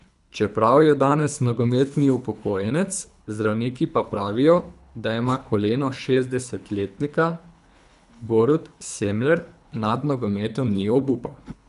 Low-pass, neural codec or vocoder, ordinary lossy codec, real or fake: 10.8 kHz; codec, 24 kHz, 3 kbps, HILCodec; none; fake